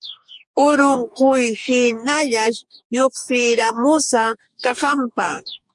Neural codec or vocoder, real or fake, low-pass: codec, 44.1 kHz, 2.6 kbps, DAC; fake; 10.8 kHz